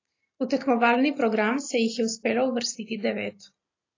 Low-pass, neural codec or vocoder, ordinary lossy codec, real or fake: 7.2 kHz; none; AAC, 32 kbps; real